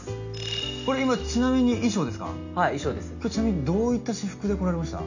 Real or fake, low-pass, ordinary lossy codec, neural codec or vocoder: real; 7.2 kHz; none; none